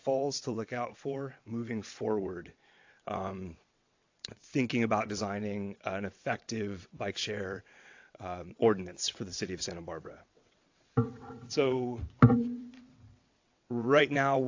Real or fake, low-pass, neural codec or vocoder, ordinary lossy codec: fake; 7.2 kHz; vocoder, 22.05 kHz, 80 mel bands, WaveNeXt; AAC, 48 kbps